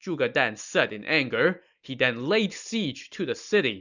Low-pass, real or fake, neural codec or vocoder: 7.2 kHz; real; none